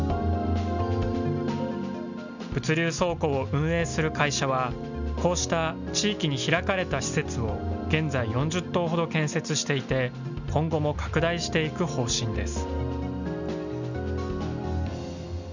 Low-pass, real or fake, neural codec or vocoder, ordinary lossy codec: 7.2 kHz; real; none; none